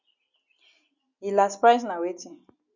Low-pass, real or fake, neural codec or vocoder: 7.2 kHz; real; none